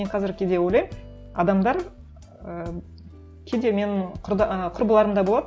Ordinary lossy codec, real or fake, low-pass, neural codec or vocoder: none; real; none; none